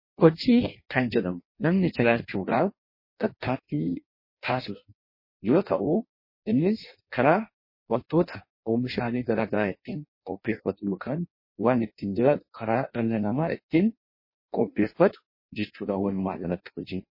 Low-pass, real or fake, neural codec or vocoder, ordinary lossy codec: 5.4 kHz; fake; codec, 16 kHz in and 24 kHz out, 0.6 kbps, FireRedTTS-2 codec; MP3, 24 kbps